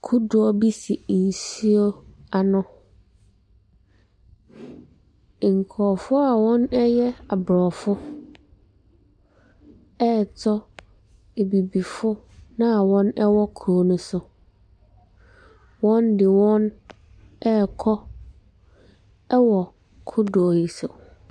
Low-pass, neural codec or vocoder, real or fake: 9.9 kHz; none; real